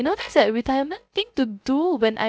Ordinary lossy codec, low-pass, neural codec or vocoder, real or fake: none; none; codec, 16 kHz, 0.3 kbps, FocalCodec; fake